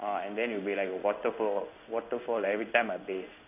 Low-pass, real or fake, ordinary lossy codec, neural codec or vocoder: 3.6 kHz; fake; none; codec, 16 kHz in and 24 kHz out, 1 kbps, XY-Tokenizer